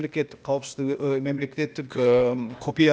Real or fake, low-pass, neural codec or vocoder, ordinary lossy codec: fake; none; codec, 16 kHz, 0.8 kbps, ZipCodec; none